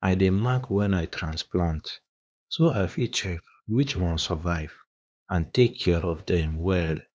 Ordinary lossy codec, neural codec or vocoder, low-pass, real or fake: none; codec, 16 kHz, 2 kbps, X-Codec, HuBERT features, trained on LibriSpeech; none; fake